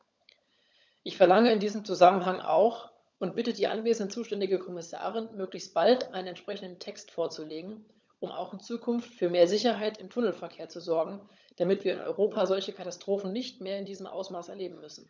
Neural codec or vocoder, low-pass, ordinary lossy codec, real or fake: codec, 16 kHz, 16 kbps, FunCodec, trained on LibriTTS, 50 frames a second; none; none; fake